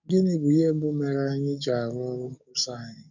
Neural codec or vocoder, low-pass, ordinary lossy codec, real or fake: codec, 44.1 kHz, 7.8 kbps, DAC; 7.2 kHz; none; fake